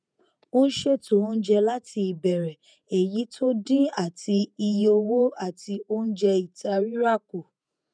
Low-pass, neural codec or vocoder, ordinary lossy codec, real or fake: 9.9 kHz; vocoder, 48 kHz, 128 mel bands, Vocos; none; fake